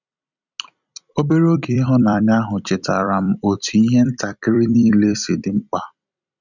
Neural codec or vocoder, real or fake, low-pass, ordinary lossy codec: vocoder, 44.1 kHz, 128 mel bands every 256 samples, BigVGAN v2; fake; 7.2 kHz; none